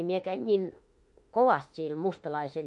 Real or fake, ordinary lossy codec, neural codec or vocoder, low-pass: fake; MP3, 48 kbps; autoencoder, 48 kHz, 32 numbers a frame, DAC-VAE, trained on Japanese speech; 10.8 kHz